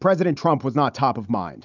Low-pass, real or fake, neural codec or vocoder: 7.2 kHz; real; none